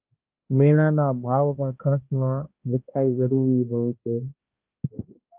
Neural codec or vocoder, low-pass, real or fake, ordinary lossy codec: codec, 16 kHz, 1 kbps, X-Codec, HuBERT features, trained on balanced general audio; 3.6 kHz; fake; Opus, 24 kbps